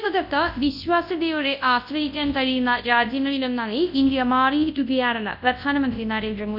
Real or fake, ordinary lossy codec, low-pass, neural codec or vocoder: fake; none; 5.4 kHz; codec, 24 kHz, 0.9 kbps, WavTokenizer, large speech release